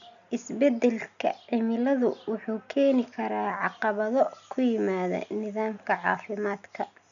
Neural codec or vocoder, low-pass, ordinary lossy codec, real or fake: none; 7.2 kHz; none; real